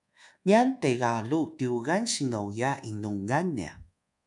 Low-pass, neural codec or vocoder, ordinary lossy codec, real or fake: 10.8 kHz; codec, 24 kHz, 1.2 kbps, DualCodec; MP3, 96 kbps; fake